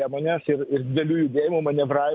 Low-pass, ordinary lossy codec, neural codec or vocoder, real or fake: 7.2 kHz; MP3, 48 kbps; none; real